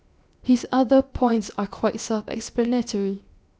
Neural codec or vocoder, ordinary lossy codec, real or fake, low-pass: codec, 16 kHz, 0.7 kbps, FocalCodec; none; fake; none